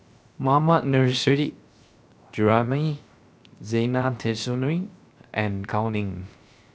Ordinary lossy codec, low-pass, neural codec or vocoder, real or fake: none; none; codec, 16 kHz, 0.7 kbps, FocalCodec; fake